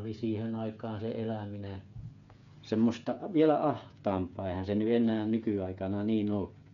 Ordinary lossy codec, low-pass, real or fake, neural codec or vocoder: none; 7.2 kHz; fake; codec, 16 kHz, 8 kbps, FreqCodec, smaller model